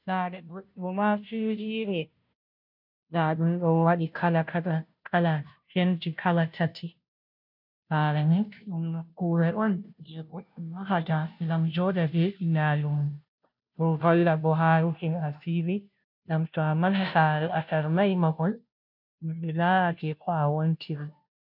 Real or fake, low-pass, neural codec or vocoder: fake; 5.4 kHz; codec, 16 kHz, 0.5 kbps, FunCodec, trained on Chinese and English, 25 frames a second